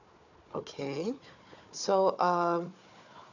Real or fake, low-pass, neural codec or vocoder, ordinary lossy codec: fake; 7.2 kHz; codec, 16 kHz, 4 kbps, FunCodec, trained on Chinese and English, 50 frames a second; none